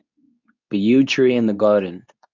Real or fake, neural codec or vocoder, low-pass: fake; codec, 24 kHz, 0.9 kbps, WavTokenizer, medium speech release version 2; 7.2 kHz